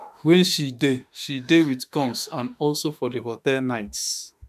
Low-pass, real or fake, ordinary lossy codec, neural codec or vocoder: 14.4 kHz; fake; none; autoencoder, 48 kHz, 32 numbers a frame, DAC-VAE, trained on Japanese speech